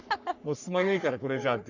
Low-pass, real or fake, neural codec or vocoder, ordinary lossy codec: 7.2 kHz; fake; codec, 44.1 kHz, 3.4 kbps, Pupu-Codec; none